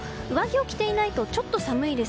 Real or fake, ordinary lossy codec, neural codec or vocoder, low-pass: real; none; none; none